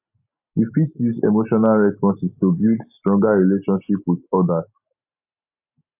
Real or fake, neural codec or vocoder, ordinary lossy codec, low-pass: real; none; AAC, 32 kbps; 3.6 kHz